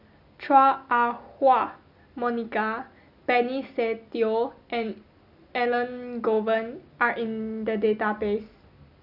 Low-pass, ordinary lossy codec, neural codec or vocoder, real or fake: 5.4 kHz; none; none; real